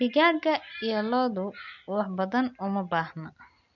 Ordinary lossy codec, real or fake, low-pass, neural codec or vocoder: none; real; 7.2 kHz; none